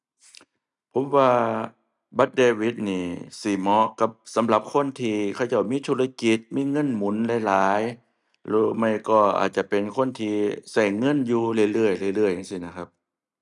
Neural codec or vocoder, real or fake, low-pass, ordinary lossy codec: none; real; 10.8 kHz; none